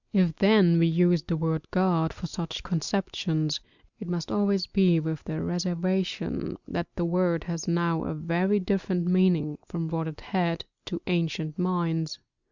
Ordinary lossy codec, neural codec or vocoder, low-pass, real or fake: Opus, 64 kbps; none; 7.2 kHz; real